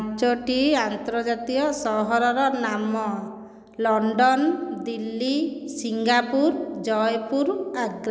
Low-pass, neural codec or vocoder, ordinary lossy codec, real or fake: none; none; none; real